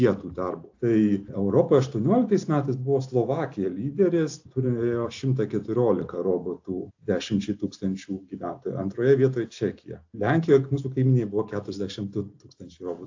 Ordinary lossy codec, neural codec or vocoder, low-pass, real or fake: AAC, 48 kbps; none; 7.2 kHz; real